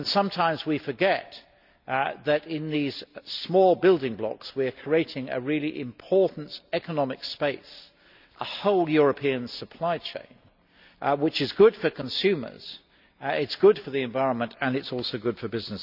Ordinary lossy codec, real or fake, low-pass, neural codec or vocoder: none; real; 5.4 kHz; none